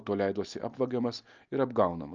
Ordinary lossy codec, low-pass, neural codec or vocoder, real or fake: Opus, 24 kbps; 7.2 kHz; none; real